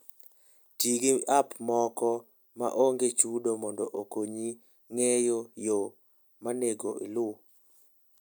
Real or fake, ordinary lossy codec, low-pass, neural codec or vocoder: real; none; none; none